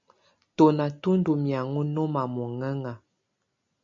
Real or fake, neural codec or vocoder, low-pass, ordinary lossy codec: real; none; 7.2 kHz; AAC, 64 kbps